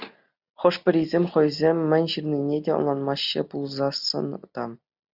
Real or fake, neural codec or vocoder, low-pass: real; none; 5.4 kHz